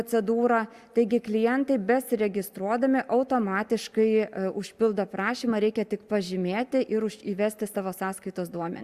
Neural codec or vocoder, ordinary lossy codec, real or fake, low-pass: none; Opus, 64 kbps; real; 14.4 kHz